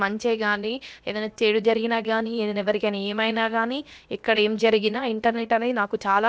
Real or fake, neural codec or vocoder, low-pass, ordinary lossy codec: fake; codec, 16 kHz, about 1 kbps, DyCAST, with the encoder's durations; none; none